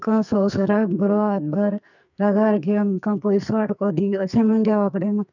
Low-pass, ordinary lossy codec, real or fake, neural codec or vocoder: 7.2 kHz; none; fake; codec, 44.1 kHz, 2.6 kbps, SNAC